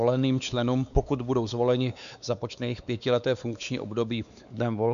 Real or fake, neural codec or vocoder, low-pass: fake; codec, 16 kHz, 4 kbps, X-Codec, WavLM features, trained on Multilingual LibriSpeech; 7.2 kHz